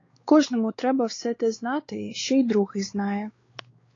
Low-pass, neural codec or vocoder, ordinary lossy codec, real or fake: 7.2 kHz; codec, 16 kHz, 4 kbps, X-Codec, WavLM features, trained on Multilingual LibriSpeech; AAC, 32 kbps; fake